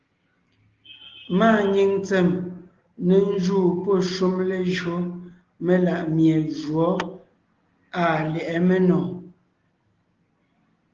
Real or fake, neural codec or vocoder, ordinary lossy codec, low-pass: real; none; Opus, 16 kbps; 7.2 kHz